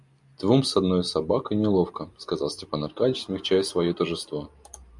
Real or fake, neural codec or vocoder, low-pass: real; none; 10.8 kHz